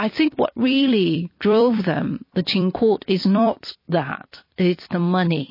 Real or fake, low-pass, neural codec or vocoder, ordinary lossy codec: fake; 5.4 kHz; vocoder, 44.1 kHz, 128 mel bands every 256 samples, BigVGAN v2; MP3, 24 kbps